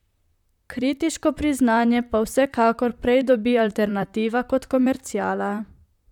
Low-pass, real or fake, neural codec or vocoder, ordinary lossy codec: 19.8 kHz; fake; vocoder, 44.1 kHz, 128 mel bands, Pupu-Vocoder; none